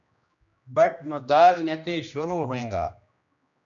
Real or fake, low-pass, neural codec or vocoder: fake; 7.2 kHz; codec, 16 kHz, 1 kbps, X-Codec, HuBERT features, trained on general audio